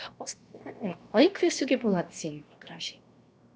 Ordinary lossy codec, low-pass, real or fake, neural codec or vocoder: none; none; fake; codec, 16 kHz, 0.7 kbps, FocalCodec